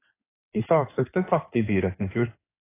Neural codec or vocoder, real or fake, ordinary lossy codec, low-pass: codec, 16 kHz in and 24 kHz out, 2.2 kbps, FireRedTTS-2 codec; fake; AAC, 24 kbps; 3.6 kHz